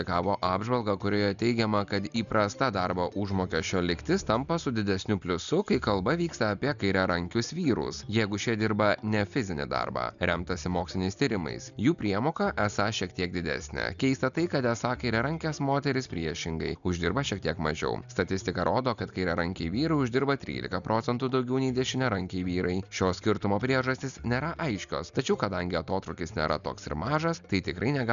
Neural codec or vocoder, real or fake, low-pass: none; real; 7.2 kHz